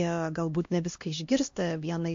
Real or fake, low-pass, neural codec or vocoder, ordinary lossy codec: fake; 7.2 kHz; codec, 16 kHz, 1 kbps, X-Codec, HuBERT features, trained on LibriSpeech; MP3, 48 kbps